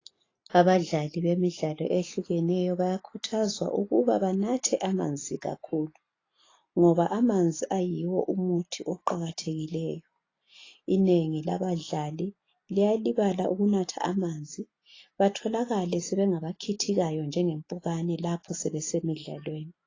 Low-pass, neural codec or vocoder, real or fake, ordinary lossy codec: 7.2 kHz; none; real; AAC, 32 kbps